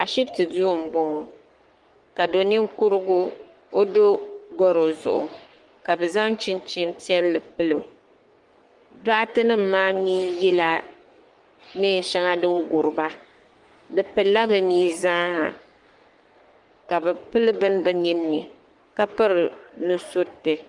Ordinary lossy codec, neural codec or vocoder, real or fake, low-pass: Opus, 24 kbps; codec, 44.1 kHz, 3.4 kbps, Pupu-Codec; fake; 10.8 kHz